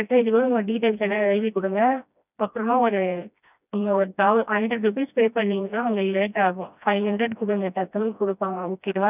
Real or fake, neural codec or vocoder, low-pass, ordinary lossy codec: fake; codec, 16 kHz, 1 kbps, FreqCodec, smaller model; 3.6 kHz; none